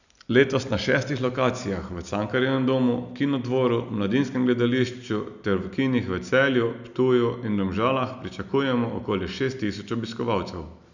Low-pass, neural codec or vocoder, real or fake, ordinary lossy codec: 7.2 kHz; none; real; none